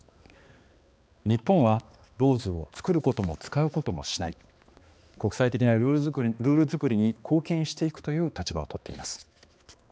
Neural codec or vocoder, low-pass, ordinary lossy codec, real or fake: codec, 16 kHz, 2 kbps, X-Codec, HuBERT features, trained on balanced general audio; none; none; fake